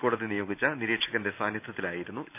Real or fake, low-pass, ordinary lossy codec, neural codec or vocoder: real; 3.6 kHz; none; none